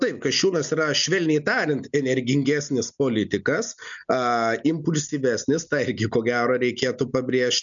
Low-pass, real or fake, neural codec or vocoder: 7.2 kHz; real; none